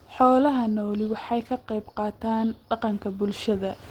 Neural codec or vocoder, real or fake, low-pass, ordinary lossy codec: none; real; 19.8 kHz; Opus, 16 kbps